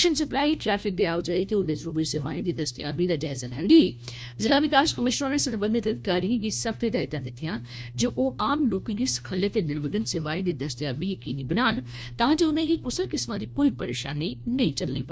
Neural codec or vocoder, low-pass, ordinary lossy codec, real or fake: codec, 16 kHz, 1 kbps, FunCodec, trained on LibriTTS, 50 frames a second; none; none; fake